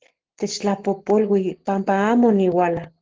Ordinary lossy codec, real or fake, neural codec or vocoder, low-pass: Opus, 16 kbps; fake; codec, 44.1 kHz, 7.8 kbps, Pupu-Codec; 7.2 kHz